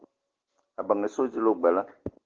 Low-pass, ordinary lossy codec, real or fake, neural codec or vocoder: 7.2 kHz; Opus, 16 kbps; real; none